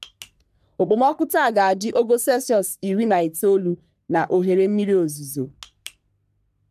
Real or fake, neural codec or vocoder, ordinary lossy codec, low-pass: fake; codec, 44.1 kHz, 3.4 kbps, Pupu-Codec; none; 14.4 kHz